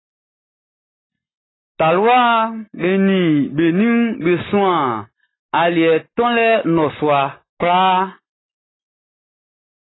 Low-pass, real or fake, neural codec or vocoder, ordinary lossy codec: 7.2 kHz; real; none; AAC, 16 kbps